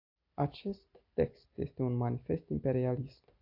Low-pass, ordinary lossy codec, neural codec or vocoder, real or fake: 5.4 kHz; AAC, 48 kbps; none; real